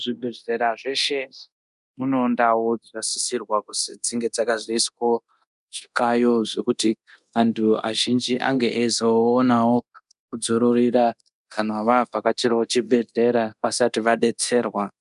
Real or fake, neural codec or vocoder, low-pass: fake; codec, 24 kHz, 0.9 kbps, DualCodec; 10.8 kHz